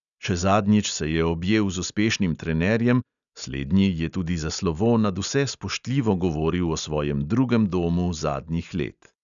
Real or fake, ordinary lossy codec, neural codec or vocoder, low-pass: real; none; none; 7.2 kHz